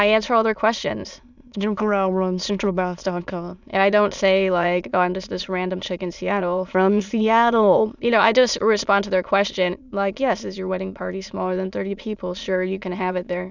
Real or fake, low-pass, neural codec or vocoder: fake; 7.2 kHz; autoencoder, 22.05 kHz, a latent of 192 numbers a frame, VITS, trained on many speakers